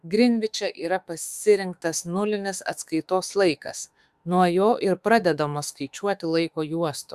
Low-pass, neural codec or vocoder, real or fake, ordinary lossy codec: 14.4 kHz; autoencoder, 48 kHz, 32 numbers a frame, DAC-VAE, trained on Japanese speech; fake; Opus, 64 kbps